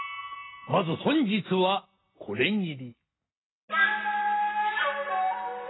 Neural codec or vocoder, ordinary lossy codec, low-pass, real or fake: none; AAC, 16 kbps; 7.2 kHz; real